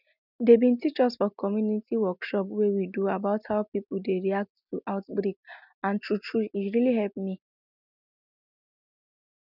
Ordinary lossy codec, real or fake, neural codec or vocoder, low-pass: none; real; none; 5.4 kHz